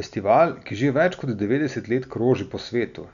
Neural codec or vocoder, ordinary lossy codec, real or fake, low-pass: none; none; real; 7.2 kHz